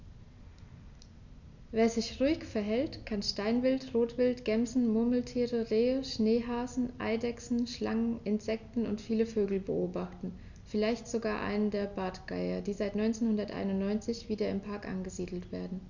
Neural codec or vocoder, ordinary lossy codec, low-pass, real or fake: none; none; 7.2 kHz; real